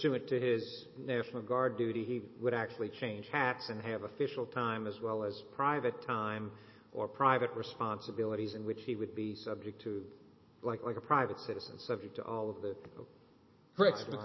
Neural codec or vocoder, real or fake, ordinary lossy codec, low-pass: none; real; MP3, 24 kbps; 7.2 kHz